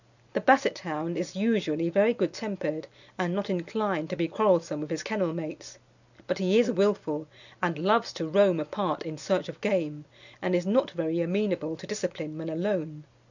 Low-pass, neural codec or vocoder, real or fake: 7.2 kHz; none; real